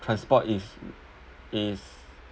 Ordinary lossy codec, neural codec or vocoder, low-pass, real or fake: none; none; none; real